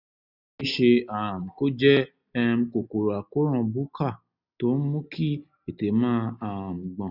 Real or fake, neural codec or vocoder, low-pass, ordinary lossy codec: real; none; 5.4 kHz; none